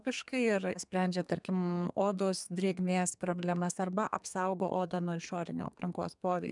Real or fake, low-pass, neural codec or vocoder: fake; 10.8 kHz; codec, 32 kHz, 1.9 kbps, SNAC